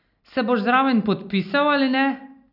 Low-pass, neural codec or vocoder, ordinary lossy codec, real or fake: 5.4 kHz; none; none; real